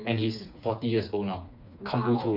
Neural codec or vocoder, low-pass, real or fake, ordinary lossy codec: codec, 16 kHz, 4 kbps, FreqCodec, smaller model; 5.4 kHz; fake; none